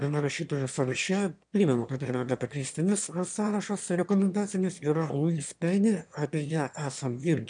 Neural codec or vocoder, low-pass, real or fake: autoencoder, 22.05 kHz, a latent of 192 numbers a frame, VITS, trained on one speaker; 9.9 kHz; fake